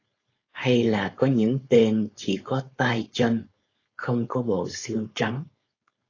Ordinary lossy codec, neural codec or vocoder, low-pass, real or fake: AAC, 32 kbps; codec, 16 kHz, 4.8 kbps, FACodec; 7.2 kHz; fake